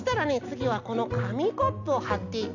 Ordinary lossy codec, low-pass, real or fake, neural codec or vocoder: none; 7.2 kHz; real; none